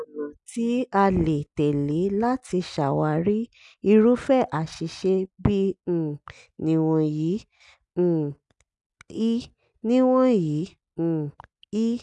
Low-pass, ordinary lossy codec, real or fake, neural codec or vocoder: 10.8 kHz; none; real; none